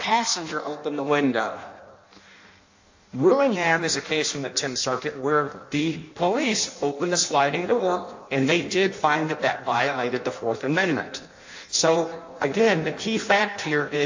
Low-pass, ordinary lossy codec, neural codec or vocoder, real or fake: 7.2 kHz; AAC, 48 kbps; codec, 16 kHz in and 24 kHz out, 0.6 kbps, FireRedTTS-2 codec; fake